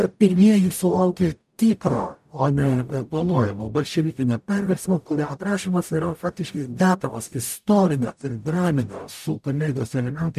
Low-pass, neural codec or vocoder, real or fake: 14.4 kHz; codec, 44.1 kHz, 0.9 kbps, DAC; fake